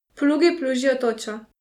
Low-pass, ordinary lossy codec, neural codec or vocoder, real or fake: 19.8 kHz; none; none; real